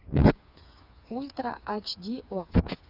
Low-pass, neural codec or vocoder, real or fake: 5.4 kHz; codec, 16 kHz, 4 kbps, FreqCodec, smaller model; fake